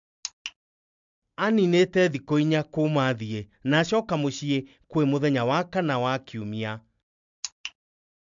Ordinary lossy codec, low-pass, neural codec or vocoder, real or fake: MP3, 64 kbps; 7.2 kHz; none; real